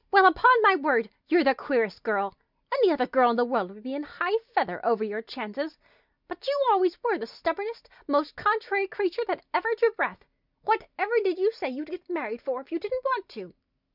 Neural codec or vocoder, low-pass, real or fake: none; 5.4 kHz; real